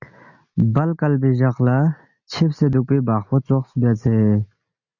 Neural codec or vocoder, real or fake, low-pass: none; real; 7.2 kHz